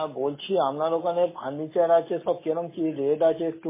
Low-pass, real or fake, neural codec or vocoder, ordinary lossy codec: 3.6 kHz; real; none; MP3, 16 kbps